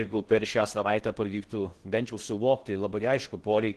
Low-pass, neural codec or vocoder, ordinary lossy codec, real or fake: 10.8 kHz; codec, 16 kHz in and 24 kHz out, 0.6 kbps, FocalCodec, streaming, 4096 codes; Opus, 16 kbps; fake